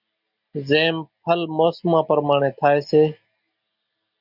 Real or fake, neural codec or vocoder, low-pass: real; none; 5.4 kHz